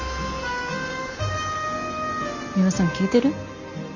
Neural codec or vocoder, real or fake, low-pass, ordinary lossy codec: none; real; 7.2 kHz; none